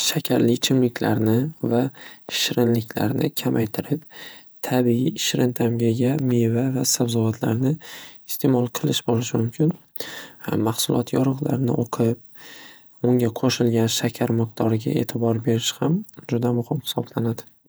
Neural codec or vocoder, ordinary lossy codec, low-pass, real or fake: vocoder, 48 kHz, 128 mel bands, Vocos; none; none; fake